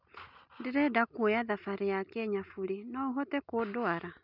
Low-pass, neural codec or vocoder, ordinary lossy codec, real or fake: 5.4 kHz; none; none; real